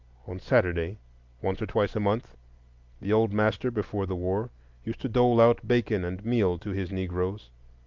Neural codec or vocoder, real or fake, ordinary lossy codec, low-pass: none; real; Opus, 24 kbps; 7.2 kHz